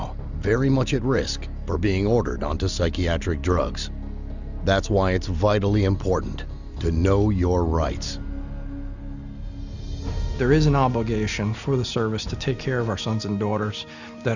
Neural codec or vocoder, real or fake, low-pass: none; real; 7.2 kHz